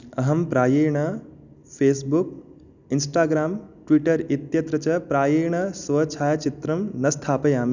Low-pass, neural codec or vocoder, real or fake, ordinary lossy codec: 7.2 kHz; none; real; none